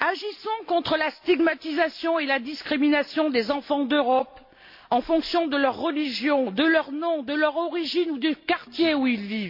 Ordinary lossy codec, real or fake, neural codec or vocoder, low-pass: MP3, 32 kbps; real; none; 5.4 kHz